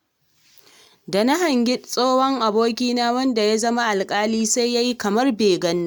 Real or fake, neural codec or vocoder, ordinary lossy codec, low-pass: real; none; none; none